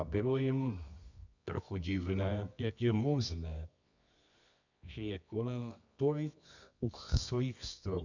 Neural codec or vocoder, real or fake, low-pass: codec, 24 kHz, 0.9 kbps, WavTokenizer, medium music audio release; fake; 7.2 kHz